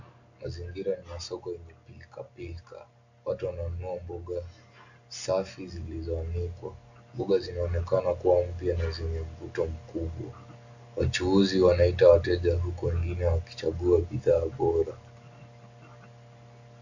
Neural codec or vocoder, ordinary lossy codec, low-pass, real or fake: none; AAC, 48 kbps; 7.2 kHz; real